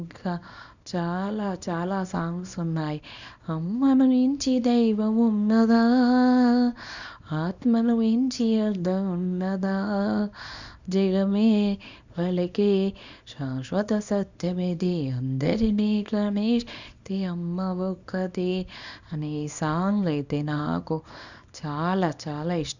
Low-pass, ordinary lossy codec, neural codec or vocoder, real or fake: 7.2 kHz; none; codec, 24 kHz, 0.9 kbps, WavTokenizer, small release; fake